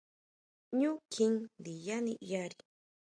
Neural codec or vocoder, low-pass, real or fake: none; 9.9 kHz; real